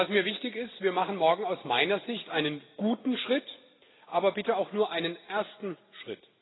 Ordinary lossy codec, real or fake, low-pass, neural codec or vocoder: AAC, 16 kbps; real; 7.2 kHz; none